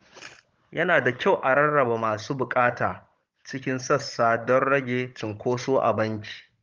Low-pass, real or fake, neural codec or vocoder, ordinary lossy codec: 7.2 kHz; fake; codec, 16 kHz, 16 kbps, FunCodec, trained on Chinese and English, 50 frames a second; Opus, 32 kbps